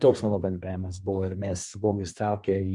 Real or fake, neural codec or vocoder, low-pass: fake; codec, 24 kHz, 1 kbps, SNAC; 10.8 kHz